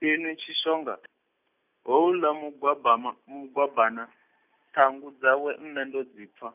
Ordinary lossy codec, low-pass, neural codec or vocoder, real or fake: none; 3.6 kHz; none; real